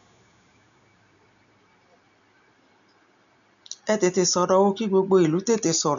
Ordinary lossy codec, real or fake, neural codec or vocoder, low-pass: none; real; none; 7.2 kHz